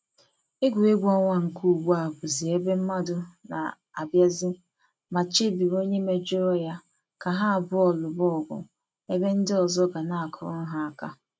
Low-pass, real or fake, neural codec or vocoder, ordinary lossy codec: none; real; none; none